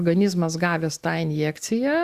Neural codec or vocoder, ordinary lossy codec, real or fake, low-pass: none; Opus, 64 kbps; real; 14.4 kHz